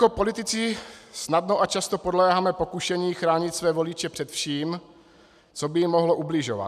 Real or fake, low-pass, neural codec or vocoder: real; 14.4 kHz; none